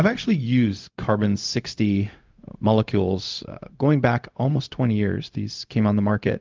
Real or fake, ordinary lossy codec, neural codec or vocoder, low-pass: fake; Opus, 32 kbps; codec, 16 kHz, 0.4 kbps, LongCat-Audio-Codec; 7.2 kHz